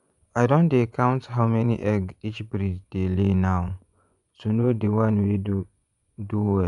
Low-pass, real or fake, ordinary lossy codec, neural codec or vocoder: 10.8 kHz; fake; none; vocoder, 24 kHz, 100 mel bands, Vocos